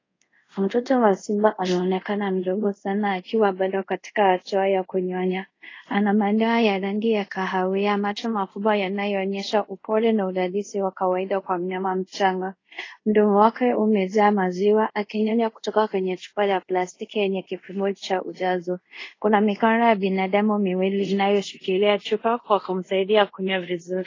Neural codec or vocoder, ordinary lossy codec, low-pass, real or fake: codec, 24 kHz, 0.5 kbps, DualCodec; AAC, 32 kbps; 7.2 kHz; fake